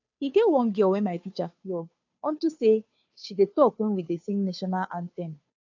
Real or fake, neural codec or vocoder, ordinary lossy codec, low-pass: fake; codec, 16 kHz, 2 kbps, FunCodec, trained on Chinese and English, 25 frames a second; AAC, 48 kbps; 7.2 kHz